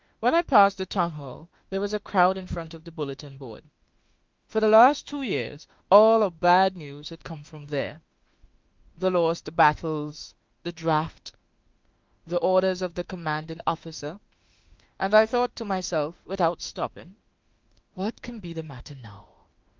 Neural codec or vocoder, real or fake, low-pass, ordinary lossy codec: autoencoder, 48 kHz, 32 numbers a frame, DAC-VAE, trained on Japanese speech; fake; 7.2 kHz; Opus, 32 kbps